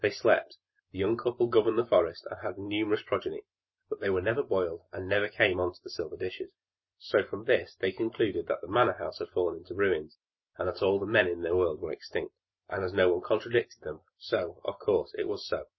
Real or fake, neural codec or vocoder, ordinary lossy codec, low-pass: real; none; MP3, 24 kbps; 7.2 kHz